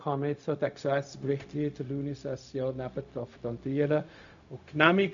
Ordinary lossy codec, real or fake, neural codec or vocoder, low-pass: AAC, 64 kbps; fake; codec, 16 kHz, 0.4 kbps, LongCat-Audio-Codec; 7.2 kHz